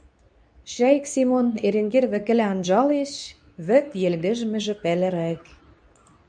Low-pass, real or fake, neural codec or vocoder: 9.9 kHz; fake; codec, 24 kHz, 0.9 kbps, WavTokenizer, medium speech release version 2